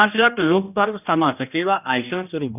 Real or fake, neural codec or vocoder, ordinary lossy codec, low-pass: fake; codec, 16 kHz, 1 kbps, X-Codec, HuBERT features, trained on general audio; none; 3.6 kHz